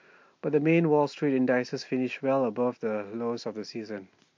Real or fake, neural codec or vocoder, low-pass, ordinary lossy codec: real; none; 7.2 kHz; MP3, 48 kbps